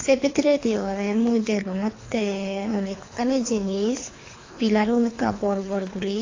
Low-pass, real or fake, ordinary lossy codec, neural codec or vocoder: 7.2 kHz; fake; AAC, 32 kbps; codec, 24 kHz, 3 kbps, HILCodec